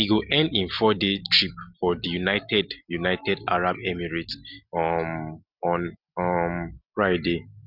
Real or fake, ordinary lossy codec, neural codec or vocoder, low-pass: real; none; none; 5.4 kHz